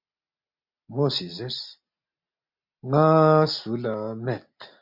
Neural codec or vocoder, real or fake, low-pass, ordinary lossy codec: none; real; 5.4 kHz; MP3, 48 kbps